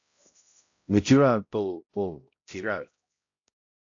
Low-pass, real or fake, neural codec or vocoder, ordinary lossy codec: 7.2 kHz; fake; codec, 16 kHz, 0.5 kbps, X-Codec, HuBERT features, trained on balanced general audio; AAC, 48 kbps